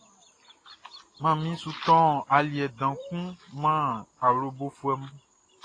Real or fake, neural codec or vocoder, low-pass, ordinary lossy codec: real; none; 9.9 kHz; AAC, 32 kbps